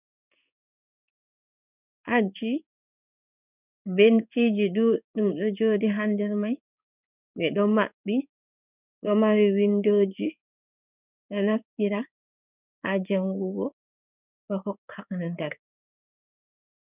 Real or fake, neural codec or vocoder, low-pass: fake; codec, 16 kHz in and 24 kHz out, 1 kbps, XY-Tokenizer; 3.6 kHz